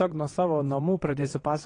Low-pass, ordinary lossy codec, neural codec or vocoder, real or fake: 9.9 kHz; AAC, 32 kbps; vocoder, 22.05 kHz, 80 mel bands, WaveNeXt; fake